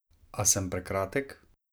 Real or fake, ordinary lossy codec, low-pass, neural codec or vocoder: real; none; none; none